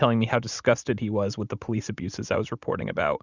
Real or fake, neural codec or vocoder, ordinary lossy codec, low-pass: real; none; Opus, 64 kbps; 7.2 kHz